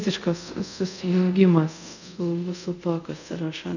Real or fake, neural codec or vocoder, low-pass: fake; codec, 24 kHz, 0.5 kbps, DualCodec; 7.2 kHz